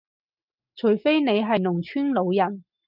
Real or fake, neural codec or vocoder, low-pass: real; none; 5.4 kHz